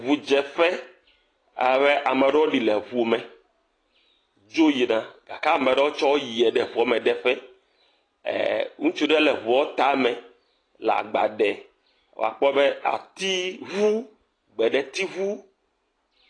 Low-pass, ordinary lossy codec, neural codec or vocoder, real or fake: 9.9 kHz; AAC, 32 kbps; none; real